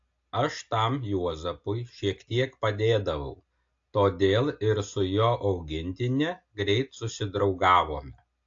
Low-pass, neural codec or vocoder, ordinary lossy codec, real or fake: 7.2 kHz; none; AAC, 48 kbps; real